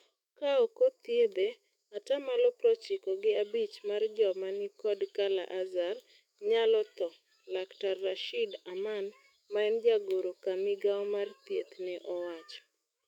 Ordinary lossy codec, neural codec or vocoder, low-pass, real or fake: none; autoencoder, 48 kHz, 128 numbers a frame, DAC-VAE, trained on Japanese speech; 19.8 kHz; fake